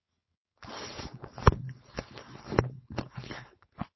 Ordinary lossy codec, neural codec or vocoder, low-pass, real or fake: MP3, 24 kbps; codec, 16 kHz, 4.8 kbps, FACodec; 7.2 kHz; fake